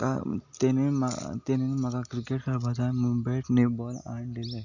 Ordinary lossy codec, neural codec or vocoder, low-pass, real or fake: none; none; 7.2 kHz; real